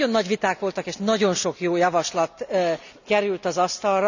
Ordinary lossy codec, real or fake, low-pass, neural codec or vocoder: none; real; 7.2 kHz; none